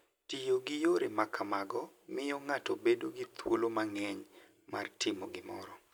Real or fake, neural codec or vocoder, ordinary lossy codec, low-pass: real; none; none; none